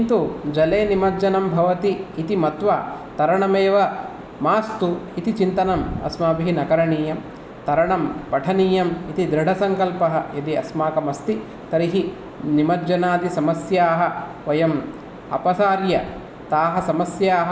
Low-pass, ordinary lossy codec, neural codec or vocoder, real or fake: none; none; none; real